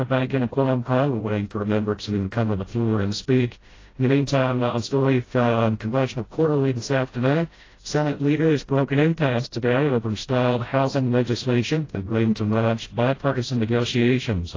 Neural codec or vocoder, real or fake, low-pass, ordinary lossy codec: codec, 16 kHz, 0.5 kbps, FreqCodec, smaller model; fake; 7.2 kHz; AAC, 32 kbps